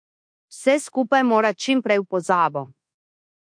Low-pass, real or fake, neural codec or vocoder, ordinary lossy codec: 9.9 kHz; fake; codec, 24 kHz, 1.2 kbps, DualCodec; MP3, 48 kbps